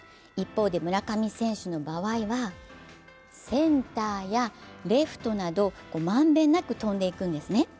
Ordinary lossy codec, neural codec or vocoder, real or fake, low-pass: none; none; real; none